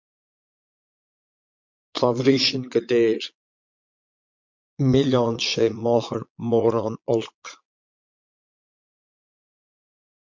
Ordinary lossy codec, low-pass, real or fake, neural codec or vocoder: MP3, 48 kbps; 7.2 kHz; fake; vocoder, 22.05 kHz, 80 mel bands, Vocos